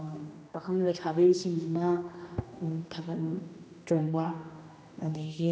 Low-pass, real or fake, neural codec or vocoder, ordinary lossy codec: none; fake; codec, 16 kHz, 1 kbps, X-Codec, HuBERT features, trained on general audio; none